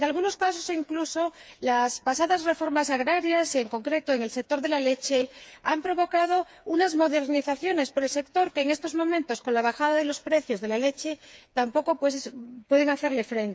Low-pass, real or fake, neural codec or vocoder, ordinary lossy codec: none; fake; codec, 16 kHz, 4 kbps, FreqCodec, smaller model; none